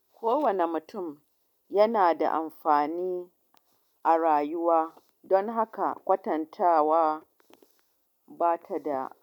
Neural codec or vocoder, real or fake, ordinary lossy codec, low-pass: none; real; none; 19.8 kHz